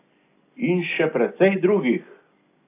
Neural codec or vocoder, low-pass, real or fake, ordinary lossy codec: none; 3.6 kHz; real; AAC, 24 kbps